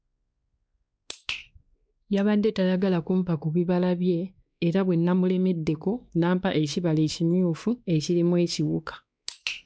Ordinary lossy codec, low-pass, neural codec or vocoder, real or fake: none; none; codec, 16 kHz, 2 kbps, X-Codec, WavLM features, trained on Multilingual LibriSpeech; fake